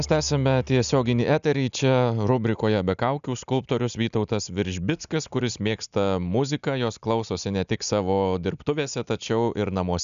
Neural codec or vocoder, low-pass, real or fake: none; 7.2 kHz; real